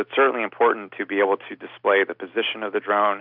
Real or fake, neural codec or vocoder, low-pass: real; none; 5.4 kHz